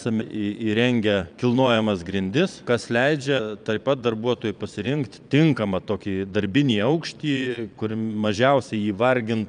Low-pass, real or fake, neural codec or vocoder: 9.9 kHz; fake; vocoder, 22.05 kHz, 80 mel bands, Vocos